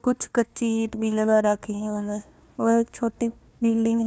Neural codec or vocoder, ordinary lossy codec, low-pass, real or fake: codec, 16 kHz, 1 kbps, FunCodec, trained on Chinese and English, 50 frames a second; none; none; fake